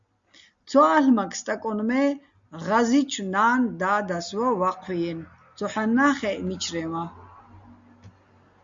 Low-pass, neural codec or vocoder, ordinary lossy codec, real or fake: 7.2 kHz; none; Opus, 64 kbps; real